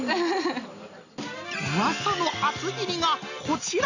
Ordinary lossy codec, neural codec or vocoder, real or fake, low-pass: none; none; real; 7.2 kHz